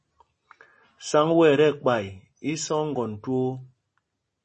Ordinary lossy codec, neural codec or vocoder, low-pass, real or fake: MP3, 32 kbps; none; 10.8 kHz; real